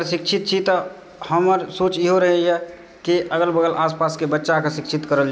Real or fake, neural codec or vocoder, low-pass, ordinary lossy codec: real; none; none; none